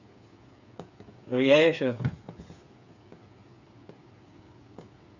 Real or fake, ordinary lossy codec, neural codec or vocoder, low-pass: fake; none; codec, 16 kHz, 8 kbps, FreqCodec, smaller model; 7.2 kHz